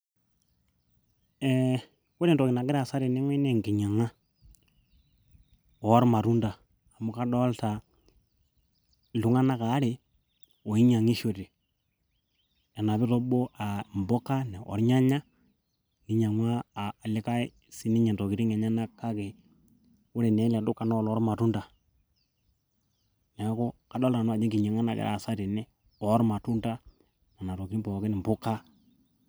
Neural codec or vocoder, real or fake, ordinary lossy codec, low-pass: none; real; none; none